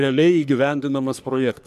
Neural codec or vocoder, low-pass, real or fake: codec, 44.1 kHz, 3.4 kbps, Pupu-Codec; 14.4 kHz; fake